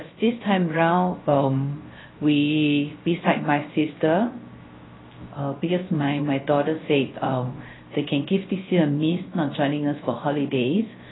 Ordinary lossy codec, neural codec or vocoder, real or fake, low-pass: AAC, 16 kbps; codec, 16 kHz, 0.3 kbps, FocalCodec; fake; 7.2 kHz